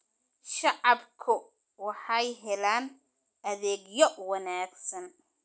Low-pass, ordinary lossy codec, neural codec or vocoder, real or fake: none; none; none; real